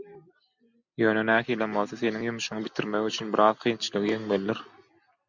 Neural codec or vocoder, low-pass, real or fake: none; 7.2 kHz; real